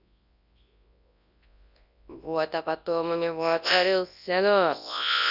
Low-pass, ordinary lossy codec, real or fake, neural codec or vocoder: 5.4 kHz; none; fake; codec, 24 kHz, 0.9 kbps, WavTokenizer, large speech release